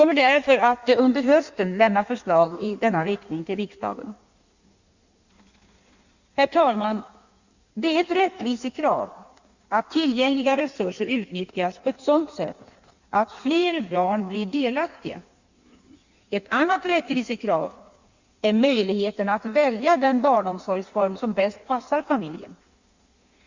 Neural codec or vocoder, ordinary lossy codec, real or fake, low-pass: codec, 16 kHz in and 24 kHz out, 1.1 kbps, FireRedTTS-2 codec; Opus, 64 kbps; fake; 7.2 kHz